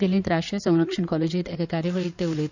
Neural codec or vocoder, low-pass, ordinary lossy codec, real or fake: codec, 16 kHz in and 24 kHz out, 2.2 kbps, FireRedTTS-2 codec; 7.2 kHz; none; fake